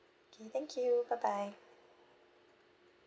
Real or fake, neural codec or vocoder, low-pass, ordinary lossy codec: real; none; none; none